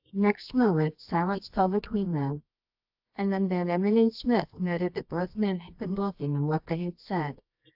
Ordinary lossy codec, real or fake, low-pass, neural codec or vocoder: AAC, 48 kbps; fake; 5.4 kHz; codec, 24 kHz, 0.9 kbps, WavTokenizer, medium music audio release